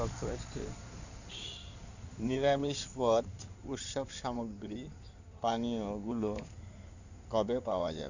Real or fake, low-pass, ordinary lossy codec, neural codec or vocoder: fake; 7.2 kHz; none; codec, 16 kHz in and 24 kHz out, 2.2 kbps, FireRedTTS-2 codec